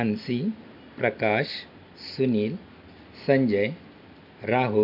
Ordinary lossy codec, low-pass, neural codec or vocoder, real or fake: MP3, 48 kbps; 5.4 kHz; none; real